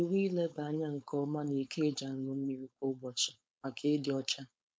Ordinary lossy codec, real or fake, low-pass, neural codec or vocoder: none; fake; none; codec, 16 kHz, 4.8 kbps, FACodec